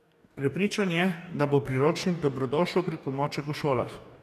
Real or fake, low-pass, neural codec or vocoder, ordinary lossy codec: fake; 14.4 kHz; codec, 44.1 kHz, 2.6 kbps, DAC; none